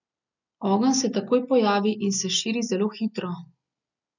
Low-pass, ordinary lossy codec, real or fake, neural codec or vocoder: 7.2 kHz; none; real; none